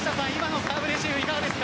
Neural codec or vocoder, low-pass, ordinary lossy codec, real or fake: none; none; none; real